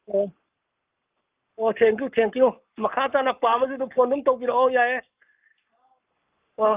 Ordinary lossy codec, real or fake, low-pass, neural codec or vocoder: Opus, 24 kbps; fake; 3.6 kHz; codec, 44.1 kHz, 7.8 kbps, Pupu-Codec